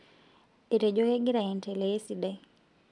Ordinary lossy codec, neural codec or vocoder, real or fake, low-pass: none; none; real; 10.8 kHz